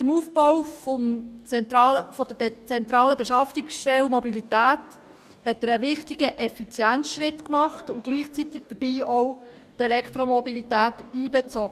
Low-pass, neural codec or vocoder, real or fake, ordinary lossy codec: 14.4 kHz; codec, 44.1 kHz, 2.6 kbps, DAC; fake; none